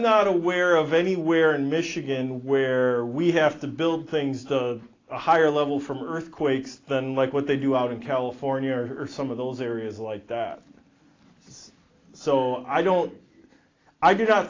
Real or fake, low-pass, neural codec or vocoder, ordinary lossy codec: real; 7.2 kHz; none; AAC, 32 kbps